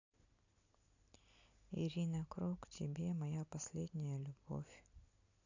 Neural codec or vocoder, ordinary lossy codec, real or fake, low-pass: none; AAC, 48 kbps; real; 7.2 kHz